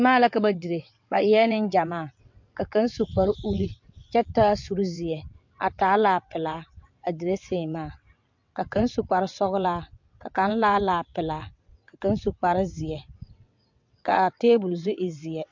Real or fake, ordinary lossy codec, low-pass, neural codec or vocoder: fake; MP3, 48 kbps; 7.2 kHz; vocoder, 22.05 kHz, 80 mel bands, WaveNeXt